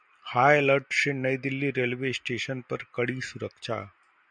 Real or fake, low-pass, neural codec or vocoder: real; 9.9 kHz; none